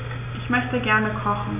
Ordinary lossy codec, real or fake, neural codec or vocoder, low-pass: none; real; none; 3.6 kHz